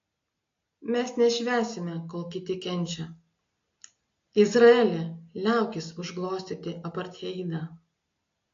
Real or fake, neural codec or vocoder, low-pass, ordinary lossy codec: real; none; 7.2 kHz; AAC, 48 kbps